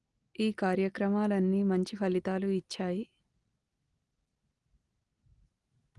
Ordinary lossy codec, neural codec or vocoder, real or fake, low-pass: Opus, 24 kbps; none; real; 10.8 kHz